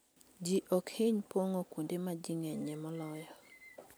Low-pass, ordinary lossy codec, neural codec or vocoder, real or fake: none; none; none; real